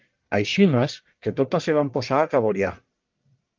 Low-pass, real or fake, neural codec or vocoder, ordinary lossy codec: 7.2 kHz; fake; codec, 44.1 kHz, 1.7 kbps, Pupu-Codec; Opus, 24 kbps